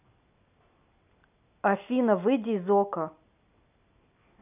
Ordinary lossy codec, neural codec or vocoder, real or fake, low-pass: none; none; real; 3.6 kHz